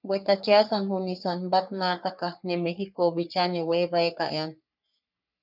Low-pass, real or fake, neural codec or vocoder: 5.4 kHz; fake; codec, 44.1 kHz, 3.4 kbps, Pupu-Codec